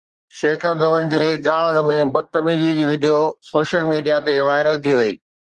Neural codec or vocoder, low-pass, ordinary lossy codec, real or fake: codec, 24 kHz, 1 kbps, SNAC; 10.8 kHz; Opus, 32 kbps; fake